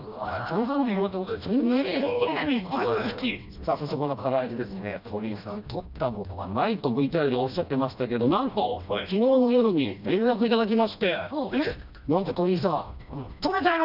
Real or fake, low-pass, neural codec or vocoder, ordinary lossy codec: fake; 5.4 kHz; codec, 16 kHz, 1 kbps, FreqCodec, smaller model; none